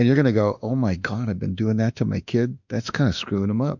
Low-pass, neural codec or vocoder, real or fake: 7.2 kHz; autoencoder, 48 kHz, 32 numbers a frame, DAC-VAE, trained on Japanese speech; fake